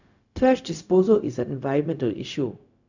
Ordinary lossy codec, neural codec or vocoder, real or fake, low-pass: none; codec, 16 kHz, 0.4 kbps, LongCat-Audio-Codec; fake; 7.2 kHz